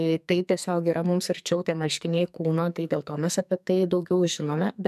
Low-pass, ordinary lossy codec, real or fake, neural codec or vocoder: 14.4 kHz; MP3, 96 kbps; fake; codec, 44.1 kHz, 2.6 kbps, SNAC